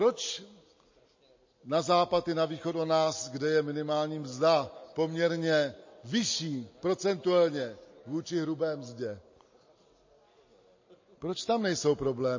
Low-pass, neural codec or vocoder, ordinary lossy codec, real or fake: 7.2 kHz; none; MP3, 32 kbps; real